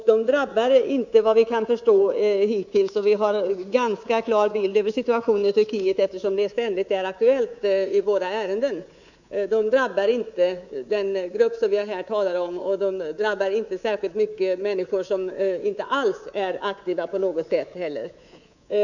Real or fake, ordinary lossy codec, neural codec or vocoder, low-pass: fake; none; codec, 24 kHz, 3.1 kbps, DualCodec; 7.2 kHz